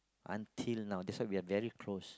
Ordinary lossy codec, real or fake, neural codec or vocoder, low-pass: none; real; none; none